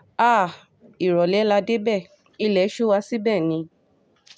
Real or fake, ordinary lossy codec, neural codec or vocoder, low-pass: real; none; none; none